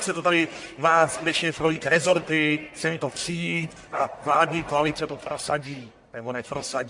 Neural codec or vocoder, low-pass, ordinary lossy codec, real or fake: codec, 44.1 kHz, 1.7 kbps, Pupu-Codec; 10.8 kHz; MP3, 64 kbps; fake